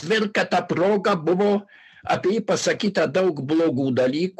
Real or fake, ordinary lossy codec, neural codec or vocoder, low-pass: real; MP3, 96 kbps; none; 14.4 kHz